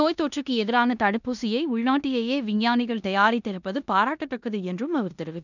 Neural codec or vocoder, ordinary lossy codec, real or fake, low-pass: codec, 16 kHz in and 24 kHz out, 0.9 kbps, LongCat-Audio-Codec, four codebook decoder; none; fake; 7.2 kHz